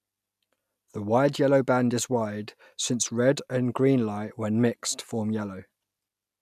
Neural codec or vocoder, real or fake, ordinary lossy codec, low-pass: none; real; none; 14.4 kHz